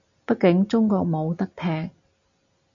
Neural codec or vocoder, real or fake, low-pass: none; real; 7.2 kHz